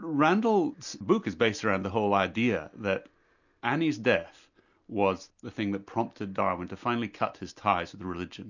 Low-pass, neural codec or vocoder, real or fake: 7.2 kHz; none; real